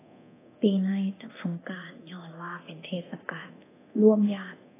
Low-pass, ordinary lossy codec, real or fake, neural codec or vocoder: 3.6 kHz; AAC, 16 kbps; fake; codec, 24 kHz, 0.9 kbps, DualCodec